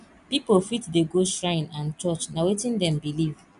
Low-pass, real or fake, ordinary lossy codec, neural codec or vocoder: 10.8 kHz; real; none; none